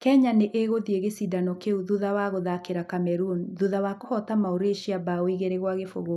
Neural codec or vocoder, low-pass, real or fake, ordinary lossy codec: none; 14.4 kHz; real; none